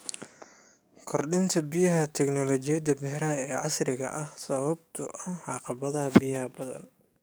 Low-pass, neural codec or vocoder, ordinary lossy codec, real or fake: none; codec, 44.1 kHz, 7.8 kbps, DAC; none; fake